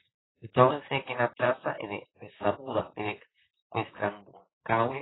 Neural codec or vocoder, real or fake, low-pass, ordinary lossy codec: vocoder, 44.1 kHz, 128 mel bands, Pupu-Vocoder; fake; 7.2 kHz; AAC, 16 kbps